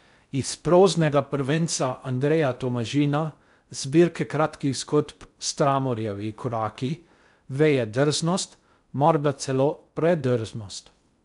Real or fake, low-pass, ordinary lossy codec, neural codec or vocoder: fake; 10.8 kHz; none; codec, 16 kHz in and 24 kHz out, 0.6 kbps, FocalCodec, streaming, 4096 codes